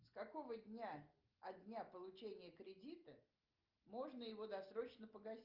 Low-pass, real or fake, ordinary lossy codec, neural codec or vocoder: 5.4 kHz; real; Opus, 24 kbps; none